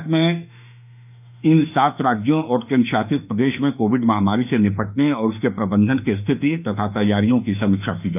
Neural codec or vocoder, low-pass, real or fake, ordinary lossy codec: autoencoder, 48 kHz, 32 numbers a frame, DAC-VAE, trained on Japanese speech; 3.6 kHz; fake; none